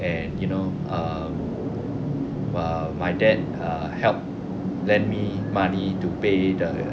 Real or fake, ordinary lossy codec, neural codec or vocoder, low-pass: real; none; none; none